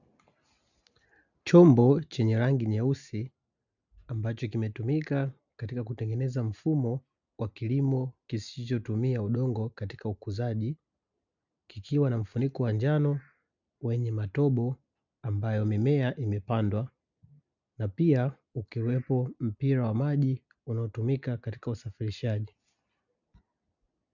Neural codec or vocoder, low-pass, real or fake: none; 7.2 kHz; real